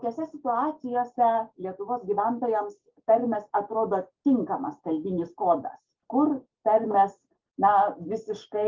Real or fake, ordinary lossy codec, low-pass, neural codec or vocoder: real; Opus, 32 kbps; 7.2 kHz; none